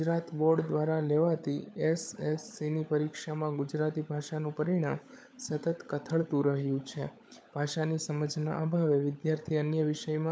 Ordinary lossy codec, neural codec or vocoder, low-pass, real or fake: none; codec, 16 kHz, 16 kbps, FunCodec, trained on LibriTTS, 50 frames a second; none; fake